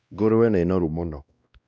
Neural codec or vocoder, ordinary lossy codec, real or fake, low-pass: codec, 16 kHz, 1 kbps, X-Codec, WavLM features, trained on Multilingual LibriSpeech; none; fake; none